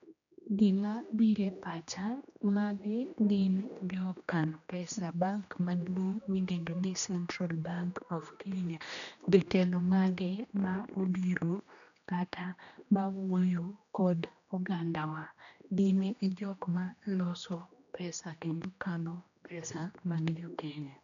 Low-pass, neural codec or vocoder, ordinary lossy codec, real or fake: 7.2 kHz; codec, 16 kHz, 1 kbps, X-Codec, HuBERT features, trained on general audio; none; fake